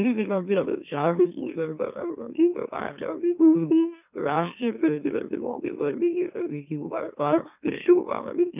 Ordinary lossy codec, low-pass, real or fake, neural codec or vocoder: none; 3.6 kHz; fake; autoencoder, 44.1 kHz, a latent of 192 numbers a frame, MeloTTS